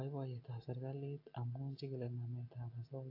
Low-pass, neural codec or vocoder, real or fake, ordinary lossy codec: 5.4 kHz; none; real; AAC, 32 kbps